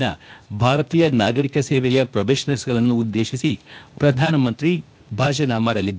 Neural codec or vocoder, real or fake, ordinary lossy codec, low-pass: codec, 16 kHz, 0.8 kbps, ZipCodec; fake; none; none